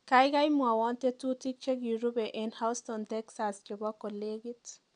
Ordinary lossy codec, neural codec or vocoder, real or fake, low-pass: MP3, 96 kbps; none; real; 9.9 kHz